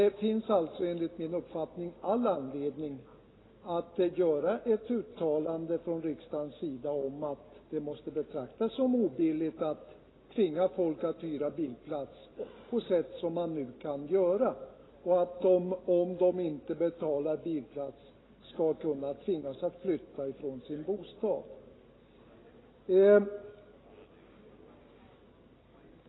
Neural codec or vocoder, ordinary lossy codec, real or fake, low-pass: none; AAC, 16 kbps; real; 7.2 kHz